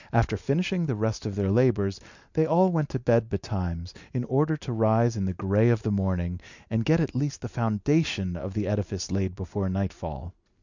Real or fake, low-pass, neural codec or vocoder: real; 7.2 kHz; none